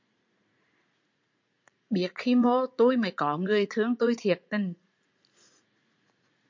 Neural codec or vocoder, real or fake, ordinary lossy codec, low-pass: vocoder, 44.1 kHz, 80 mel bands, Vocos; fake; MP3, 48 kbps; 7.2 kHz